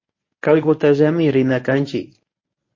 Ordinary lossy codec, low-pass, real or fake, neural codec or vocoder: MP3, 32 kbps; 7.2 kHz; fake; codec, 24 kHz, 0.9 kbps, WavTokenizer, medium speech release version 1